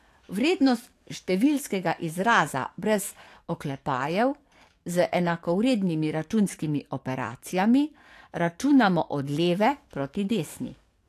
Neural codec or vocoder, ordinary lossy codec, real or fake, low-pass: codec, 44.1 kHz, 7.8 kbps, DAC; AAC, 64 kbps; fake; 14.4 kHz